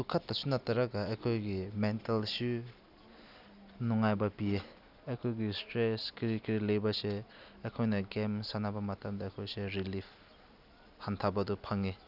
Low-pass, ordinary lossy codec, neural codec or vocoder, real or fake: 5.4 kHz; none; none; real